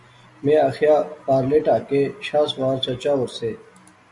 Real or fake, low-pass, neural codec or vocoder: real; 10.8 kHz; none